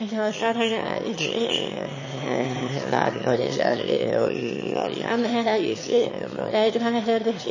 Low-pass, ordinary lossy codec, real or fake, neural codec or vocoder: 7.2 kHz; MP3, 32 kbps; fake; autoencoder, 22.05 kHz, a latent of 192 numbers a frame, VITS, trained on one speaker